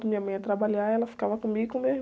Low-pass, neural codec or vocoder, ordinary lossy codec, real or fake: none; none; none; real